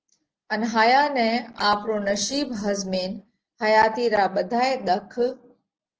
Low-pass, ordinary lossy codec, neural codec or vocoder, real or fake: 7.2 kHz; Opus, 16 kbps; none; real